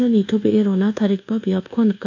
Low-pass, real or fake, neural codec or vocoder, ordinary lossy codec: 7.2 kHz; fake; codec, 24 kHz, 1.2 kbps, DualCodec; none